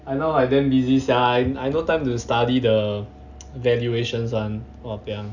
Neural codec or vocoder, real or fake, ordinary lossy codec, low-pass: none; real; none; 7.2 kHz